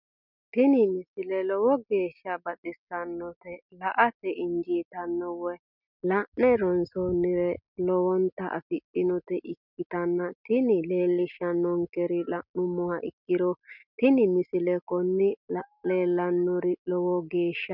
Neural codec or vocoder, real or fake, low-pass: none; real; 5.4 kHz